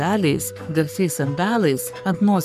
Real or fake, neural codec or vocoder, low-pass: fake; codec, 44.1 kHz, 3.4 kbps, Pupu-Codec; 14.4 kHz